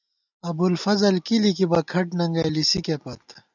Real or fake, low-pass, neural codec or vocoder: real; 7.2 kHz; none